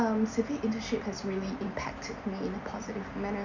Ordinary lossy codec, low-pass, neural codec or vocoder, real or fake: none; 7.2 kHz; none; real